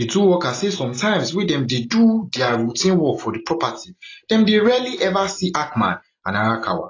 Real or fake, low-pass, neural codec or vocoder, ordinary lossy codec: real; 7.2 kHz; none; AAC, 32 kbps